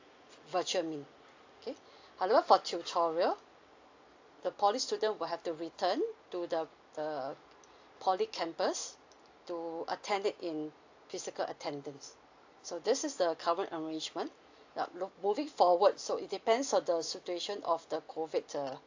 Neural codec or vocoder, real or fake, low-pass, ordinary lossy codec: none; real; 7.2 kHz; AAC, 48 kbps